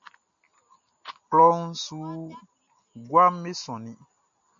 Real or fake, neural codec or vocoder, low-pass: real; none; 7.2 kHz